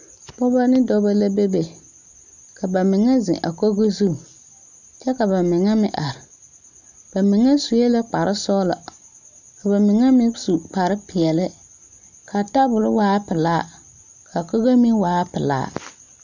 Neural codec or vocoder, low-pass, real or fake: none; 7.2 kHz; real